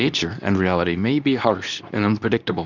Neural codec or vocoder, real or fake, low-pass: codec, 24 kHz, 0.9 kbps, WavTokenizer, medium speech release version 2; fake; 7.2 kHz